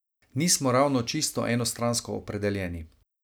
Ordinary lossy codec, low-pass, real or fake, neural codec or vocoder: none; none; real; none